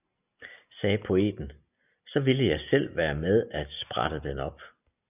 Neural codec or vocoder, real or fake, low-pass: none; real; 3.6 kHz